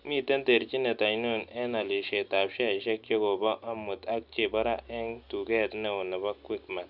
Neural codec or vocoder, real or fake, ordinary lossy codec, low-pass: none; real; none; 5.4 kHz